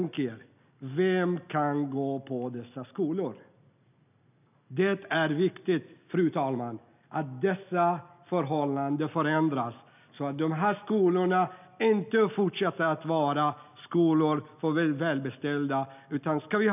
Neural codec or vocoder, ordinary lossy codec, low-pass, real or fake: none; none; 3.6 kHz; real